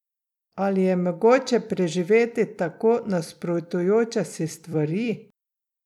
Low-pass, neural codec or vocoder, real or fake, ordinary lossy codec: 19.8 kHz; vocoder, 44.1 kHz, 128 mel bands every 256 samples, BigVGAN v2; fake; none